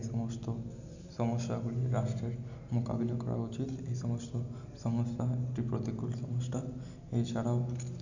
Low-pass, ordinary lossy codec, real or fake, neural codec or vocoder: 7.2 kHz; none; real; none